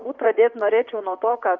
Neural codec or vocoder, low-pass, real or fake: vocoder, 44.1 kHz, 128 mel bands, Pupu-Vocoder; 7.2 kHz; fake